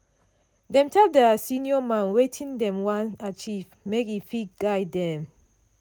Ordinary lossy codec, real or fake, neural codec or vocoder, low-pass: none; real; none; none